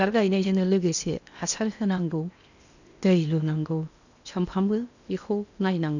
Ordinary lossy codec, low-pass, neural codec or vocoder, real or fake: none; 7.2 kHz; codec, 16 kHz in and 24 kHz out, 0.8 kbps, FocalCodec, streaming, 65536 codes; fake